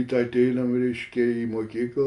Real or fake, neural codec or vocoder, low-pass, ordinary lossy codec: real; none; 10.8 kHz; Opus, 64 kbps